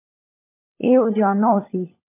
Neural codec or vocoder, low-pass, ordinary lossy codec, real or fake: codec, 16 kHz, 4 kbps, FunCodec, trained on LibriTTS, 50 frames a second; 3.6 kHz; MP3, 24 kbps; fake